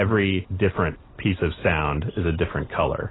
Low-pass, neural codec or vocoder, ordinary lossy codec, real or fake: 7.2 kHz; none; AAC, 16 kbps; real